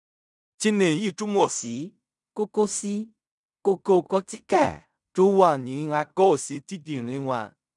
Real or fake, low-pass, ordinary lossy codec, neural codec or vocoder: fake; 10.8 kHz; none; codec, 16 kHz in and 24 kHz out, 0.4 kbps, LongCat-Audio-Codec, fine tuned four codebook decoder